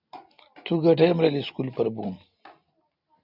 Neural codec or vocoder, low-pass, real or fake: none; 5.4 kHz; real